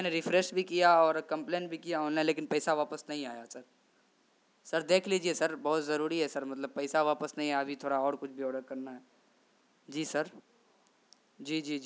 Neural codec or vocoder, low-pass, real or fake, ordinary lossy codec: none; none; real; none